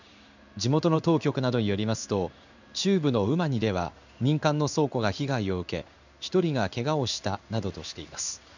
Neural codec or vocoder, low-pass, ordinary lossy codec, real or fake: codec, 16 kHz in and 24 kHz out, 1 kbps, XY-Tokenizer; 7.2 kHz; none; fake